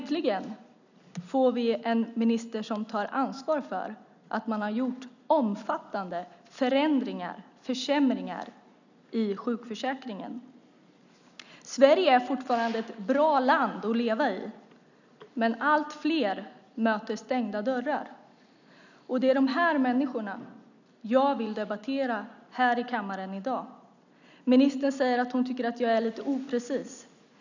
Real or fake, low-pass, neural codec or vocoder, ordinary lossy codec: real; 7.2 kHz; none; none